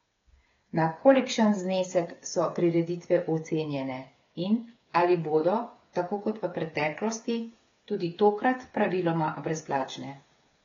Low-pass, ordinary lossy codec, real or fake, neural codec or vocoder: 7.2 kHz; AAC, 32 kbps; fake; codec, 16 kHz, 8 kbps, FreqCodec, smaller model